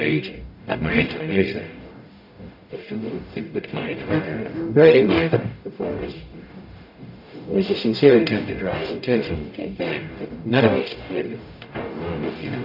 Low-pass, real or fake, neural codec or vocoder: 5.4 kHz; fake; codec, 44.1 kHz, 0.9 kbps, DAC